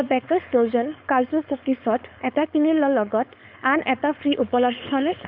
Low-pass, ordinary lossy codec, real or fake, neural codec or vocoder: 5.4 kHz; none; fake; codec, 16 kHz, 4.8 kbps, FACodec